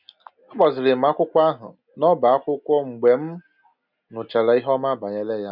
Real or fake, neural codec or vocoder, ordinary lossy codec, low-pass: real; none; none; 5.4 kHz